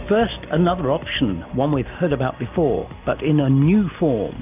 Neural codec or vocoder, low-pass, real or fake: none; 3.6 kHz; real